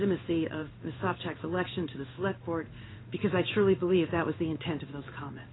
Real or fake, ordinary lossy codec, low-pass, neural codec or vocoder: fake; AAC, 16 kbps; 7.2 kHz; codec, 16 kHz in and 24 kHz out, 1 kbps, XY-Tokenizer